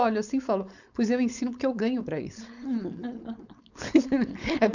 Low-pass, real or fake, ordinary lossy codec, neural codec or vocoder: 7.2 kHz; fake; none; codec, 16 kHz, 4.8 kbps, FACodec